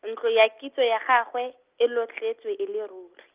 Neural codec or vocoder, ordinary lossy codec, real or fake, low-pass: none; Opus, 24 kbps; real; 3.6 kHz